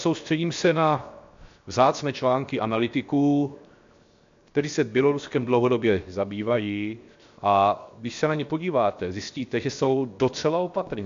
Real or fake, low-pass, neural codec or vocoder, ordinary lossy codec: fake; 7.2 kHz; codec, 16 kHz, 0.7 kbps, FocalCodec; AAC, 64 kbps